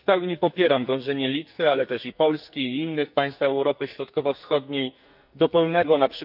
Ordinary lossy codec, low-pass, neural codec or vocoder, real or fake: none; 5.4 kHz; codec, 44.1 kHz, 2.6 kbps, SNAC; fake